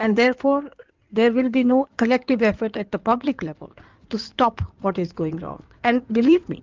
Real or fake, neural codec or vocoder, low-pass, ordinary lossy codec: fake; codec, 16 kHz in and 24 kHz out, 2.2 kbps, FireRedTTS-2 codec; 7.2 kHz; Opus, 24 kbps